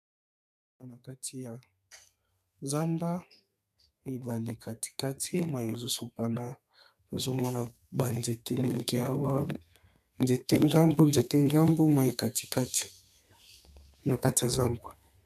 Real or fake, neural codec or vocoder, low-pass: fake; codec, 32 kHz, 1.9 kbps, SNAC; 14.4 kHz